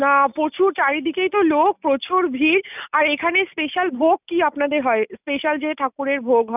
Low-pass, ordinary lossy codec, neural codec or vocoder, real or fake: 3.6 kHz; none; none; real